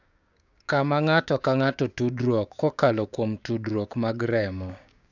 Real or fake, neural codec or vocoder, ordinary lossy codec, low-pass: fake; codec, 16 kHz, 6 kbps, DAC; none; 7.2 kHz